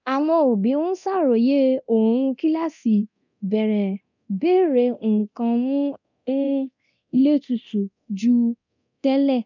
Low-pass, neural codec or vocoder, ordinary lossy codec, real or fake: 7.2 kHz; codec, 24 kHz, 0.9 kbps, DualCodec; none; fake